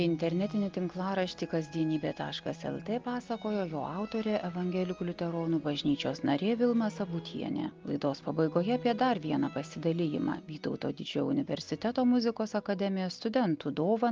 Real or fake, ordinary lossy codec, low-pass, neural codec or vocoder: real; Opus, 32 kbps; 7.2 kHz; none